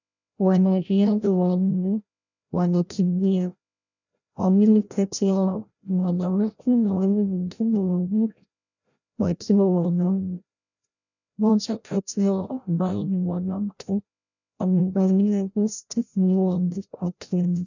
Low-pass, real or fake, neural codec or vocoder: 7.2 kHz; fake; codec, 16 kHz, 0.5 kbps, FreqCodec, larger model